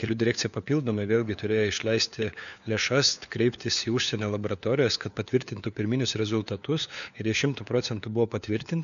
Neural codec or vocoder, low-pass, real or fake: codec, 16 kHz, 4 kbps, FunCodec, trained on LibriTTS, 50 frames a second; 7.2 kHz; fake